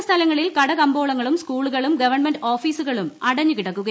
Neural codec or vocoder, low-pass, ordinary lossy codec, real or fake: none; none; none; real